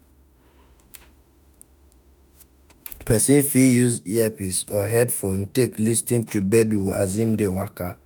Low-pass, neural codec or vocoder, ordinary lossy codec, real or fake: none; autoencoder, 48 kHz, 32 numbers a frame, DAC-VAE, trained on Japanese speech; none; fake